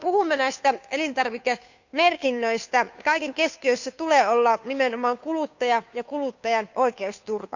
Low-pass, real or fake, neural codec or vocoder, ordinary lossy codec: 7.2 kHz; fake; codec, 16 kHz, 2 kbps, FunCodec, trained on Chinese and English, 25 frames a second; none